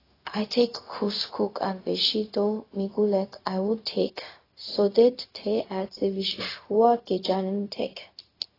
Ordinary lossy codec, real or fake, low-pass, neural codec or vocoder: AAC, 24 kbps; fake; 5.4 kHz; codec, 16 kHz, 0.4 kbps, LongCat-Audio-Codec